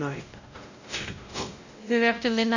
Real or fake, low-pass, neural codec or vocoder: fake; 7.2 kHz; codec, 16 kHz, 0.5 kbps, X-Codec, WavLM features, trained on Multilingual LibriSpeech